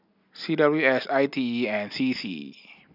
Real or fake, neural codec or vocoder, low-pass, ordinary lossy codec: real; none; 5.4 kHz; none